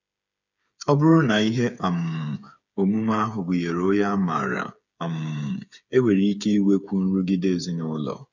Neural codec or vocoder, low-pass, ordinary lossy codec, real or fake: codec, 16 kHz, 8 kbps, FreqCodec, smaller model; 7.2 kHz; none; fake